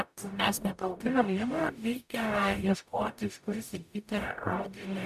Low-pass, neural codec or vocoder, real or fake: 14.4 kHz; codec, 44.1 kHz, 0.9 kbps, DAC; fake